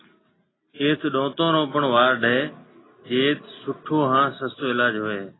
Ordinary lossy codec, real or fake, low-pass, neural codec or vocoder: AAC, 16 kbps; real; 7.2 kHz; none